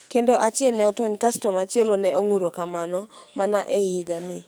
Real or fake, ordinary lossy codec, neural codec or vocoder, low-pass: fake; none; codec, 44.1 kHz, 2.6 kbps, SNAC; none